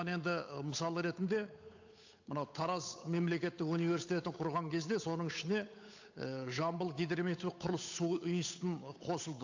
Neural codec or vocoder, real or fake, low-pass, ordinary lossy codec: codec, 16 kHz, 8 kbps, FunCodec, trained on Chinese and English, 25 frames a second; fake; 7.2 kHz; AAC, 48 kbps